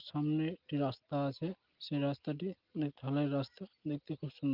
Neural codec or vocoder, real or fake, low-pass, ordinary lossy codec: none; real; 5.4 kHz; Opus, 32 kbps